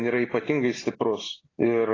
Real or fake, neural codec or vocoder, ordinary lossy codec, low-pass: real; none; AAC, 32 kbps; 7.2 kHz